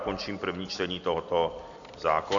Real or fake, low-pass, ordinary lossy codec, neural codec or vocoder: real; 7.2 kHz; MP3, 48 kbps; none